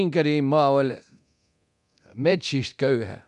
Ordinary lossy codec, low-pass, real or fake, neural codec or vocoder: none; 10.8 kHz; fake; codec, 24 kHz, 0.9 kbps, DualCodec